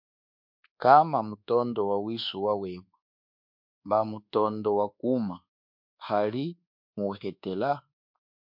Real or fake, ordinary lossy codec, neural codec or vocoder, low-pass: fake; MP3, 48 kbps; codec, 24 kHz, 1.2 kbps, DualCodec; 5.4 kHz